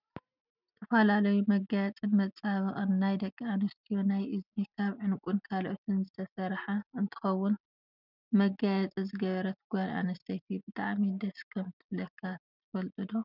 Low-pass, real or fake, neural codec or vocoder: 5.4 kHz; real; none